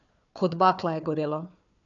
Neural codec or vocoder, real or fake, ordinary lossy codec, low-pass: codec, 16 kHz, 4 kbps, FunCodec, trained on Chinese and English, 50 frames a second; fake; none; 7.2 kHz